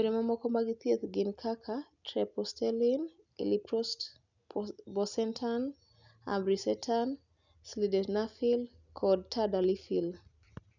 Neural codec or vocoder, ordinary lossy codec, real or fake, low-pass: none; none; real; 7.2 kHz